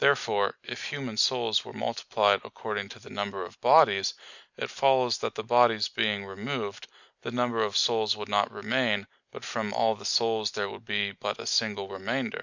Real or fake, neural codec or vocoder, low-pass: real; none; 7.2 kHz